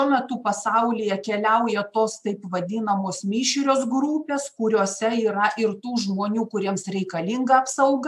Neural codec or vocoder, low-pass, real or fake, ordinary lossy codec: none; 14.4 kHz; real; AAC, 96 kbps